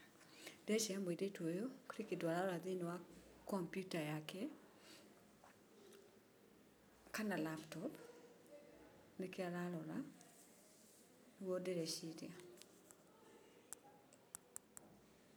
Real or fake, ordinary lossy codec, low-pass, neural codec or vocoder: real; none; none; none